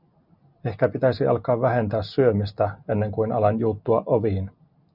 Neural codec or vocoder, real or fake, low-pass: none; real; 5.4 kHz